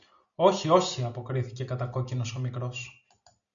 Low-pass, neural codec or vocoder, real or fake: 7.2 kHz; none; real